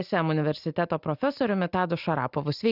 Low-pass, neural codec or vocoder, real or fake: 5.4 kHz; none; real